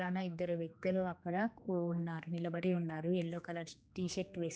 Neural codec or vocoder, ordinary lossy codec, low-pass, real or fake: codec, 16 kHz, 2 kbps, X-Codec, HuBERT features, trained on general audio; none; none; fake